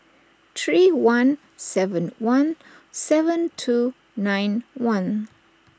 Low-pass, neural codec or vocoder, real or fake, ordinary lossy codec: none; none; real; none